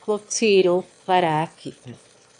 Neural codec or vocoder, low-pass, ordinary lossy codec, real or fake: autoencoder, 22.05 kHz, a latent of 192 numbers a frame, VITS, trained on one speaker; 9.9 kHz; AAC, 64 kbps; fake